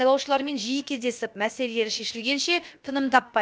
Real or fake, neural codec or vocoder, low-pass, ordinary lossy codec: fake; codec, 16 kHz, about 1 kbps, DyCAST, with the encoder's durations; none; none